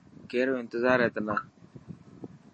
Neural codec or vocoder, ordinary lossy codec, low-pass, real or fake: none; MP3, 32 kbps; 9.9 kHz; real